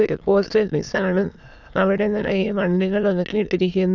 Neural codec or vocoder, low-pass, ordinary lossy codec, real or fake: autoencoder, 22.05 kHz, a latent of 192 numbers a frame, VITS, trained on many speakers; 7.2 kHz; none; fake